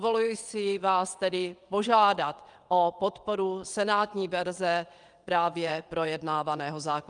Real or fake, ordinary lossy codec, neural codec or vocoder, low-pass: fake; Opus, 32 kbps; vocoder, 22.05 kHz, 80 mel bands, WaveNeXt; 9.9 kHz